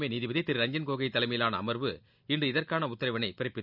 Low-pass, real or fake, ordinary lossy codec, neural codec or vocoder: 5.4 kHz; real; none; none